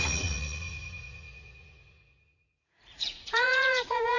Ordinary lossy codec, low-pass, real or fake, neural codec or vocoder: none; 7.2 kHz; fake; vocoder, 44.1 kHz, 80 mel bands, Vocos